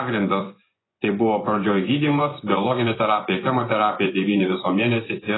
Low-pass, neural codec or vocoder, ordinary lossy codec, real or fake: 7.2 kHz; vocoder, 24 kHz, 100 mel bands, Vocos; AAC, 16 kbps; fake